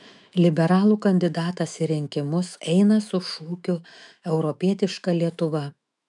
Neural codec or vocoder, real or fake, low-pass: autoencoder, 48 kHz, 128 numbers a frame, DAC-VAE, trained on Japanese speech; fake; 10.8 kHz